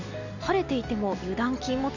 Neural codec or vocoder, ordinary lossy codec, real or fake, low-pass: none; none; real; 7.2 kHz